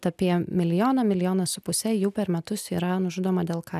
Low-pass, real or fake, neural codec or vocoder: 14.4 kHz; real; none